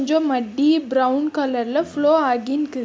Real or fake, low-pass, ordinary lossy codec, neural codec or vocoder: real; none; none; none